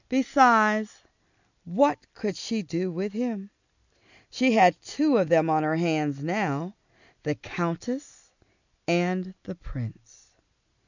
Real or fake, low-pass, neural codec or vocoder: real; 7.2 kHz; none